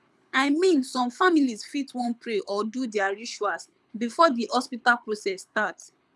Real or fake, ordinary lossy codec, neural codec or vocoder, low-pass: fake; none; codec, 24 kHz, 6 kbps, HILCodec; none